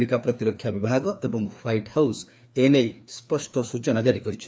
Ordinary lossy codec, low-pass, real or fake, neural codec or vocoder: none; none; fake; codec, 16 kHz, 2 kbps, FreqCodec, larger model